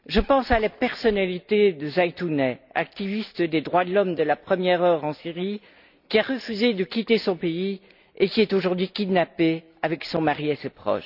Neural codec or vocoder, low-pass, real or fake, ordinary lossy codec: none; 5.4 kHz; real; none